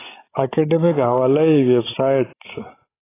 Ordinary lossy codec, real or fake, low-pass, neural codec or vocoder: AAC, 16 kbps; fake; 3.6 kHz; codec, 16 kHz, 16 kbps, FreqCodec, larger model